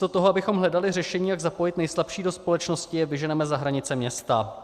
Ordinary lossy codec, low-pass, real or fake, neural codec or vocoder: Opus, 64 kbps; 14.4 kHz; real; none